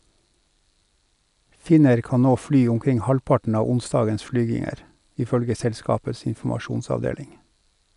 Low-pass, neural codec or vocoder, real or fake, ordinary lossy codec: 10.8 kHz; none; real; none